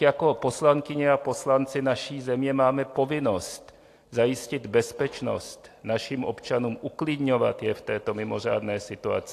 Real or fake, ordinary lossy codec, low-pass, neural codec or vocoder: real; AAC, 64 kbps; 14.4 kHz; none